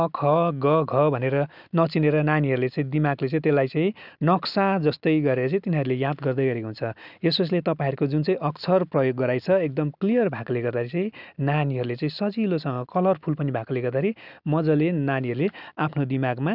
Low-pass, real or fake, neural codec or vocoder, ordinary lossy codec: 5.4 kHz; real; none; none